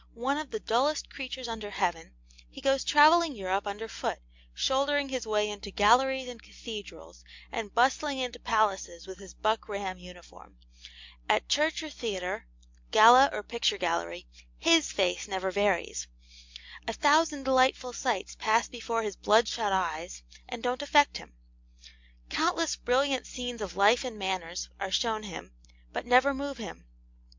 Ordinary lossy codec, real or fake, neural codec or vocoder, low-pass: MP3, 64 kbps; real; none; 7.2 kHz